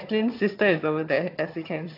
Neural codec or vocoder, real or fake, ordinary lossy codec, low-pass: vocoder, 22.05 kHz, 80 mel bands, HiFi-GAN; fake; AAC, 32 kbps; 5.4 kHz